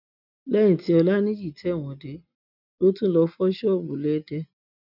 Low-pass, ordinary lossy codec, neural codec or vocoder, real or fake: 5.4 kHz; none; none; real